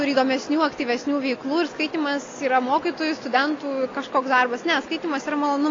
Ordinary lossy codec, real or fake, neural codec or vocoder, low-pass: AAC, 32 kbps; real; none; 7.2 kHz